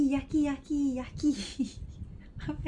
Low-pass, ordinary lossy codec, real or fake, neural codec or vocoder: 10.8 kHz; none; real; none